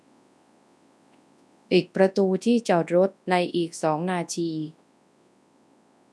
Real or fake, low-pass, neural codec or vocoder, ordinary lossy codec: fake; none; codec, 24 kHz, 0.9 kbps, WavTokenizer, large speech release; none